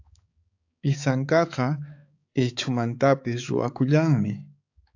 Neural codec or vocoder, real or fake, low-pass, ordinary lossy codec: codec, 16 kHz, 4 kbps, X-Codec, HuBERT features, trained on balanced general audio; fake; 7.2 kHz; AAC, 48 kbps